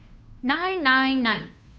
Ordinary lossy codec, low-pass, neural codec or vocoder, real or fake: none; none; codec, 16 kHz, 2 kbps, FunCodec, trained on Chinese and English, 25 frames a second; fake